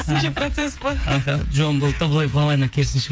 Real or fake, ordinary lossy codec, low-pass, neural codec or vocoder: fake; none; none; codec, 16 kHz, 8 kbps, FreqCodec, smaller model